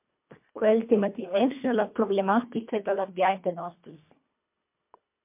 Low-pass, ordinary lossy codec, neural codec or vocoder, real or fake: 3.6 kHz; MP3, 32 kbps; codec, 24 kHz, 1.5 kbps, HILCodec; fake